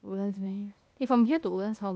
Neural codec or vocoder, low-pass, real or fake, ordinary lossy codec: codec, 16 kHz, 0.8 kbps, ZipCodec; none; fake; none